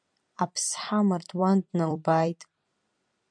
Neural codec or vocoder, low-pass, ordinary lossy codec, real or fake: vocoder, 44.1 kHz, 128 mel bands every 256 samples, BigVGAN v2; 9.9 kHz; MP3, 64 kbps; fake